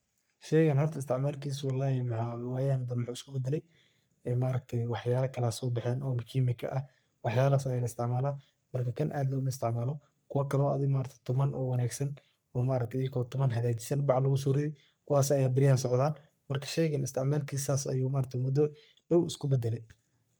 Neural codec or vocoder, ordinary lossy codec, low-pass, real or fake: codec, 44.1 kHz, 3.4 kbps, Pupu-Codec; none; none; fake